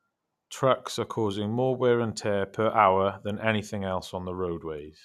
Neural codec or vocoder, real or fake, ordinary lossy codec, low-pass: none; real; none; 14.4 kHz